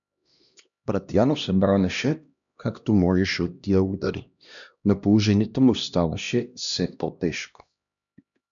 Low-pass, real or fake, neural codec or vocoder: 7.2 kHz; fake; codec, 16 kHz, 1 kbps, X-Codec, HuBERT features, trained on LibriSpeech